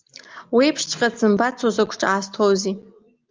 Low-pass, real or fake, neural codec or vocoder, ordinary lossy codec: 7.2 kHz; real; none; Opus, 24 kbps